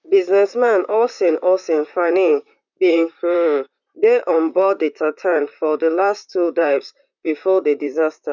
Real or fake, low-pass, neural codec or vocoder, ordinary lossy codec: fake; 7.2 kHz; vocoder, 44.1 kHz, 128 mel bands, Pupu-Vocoder; none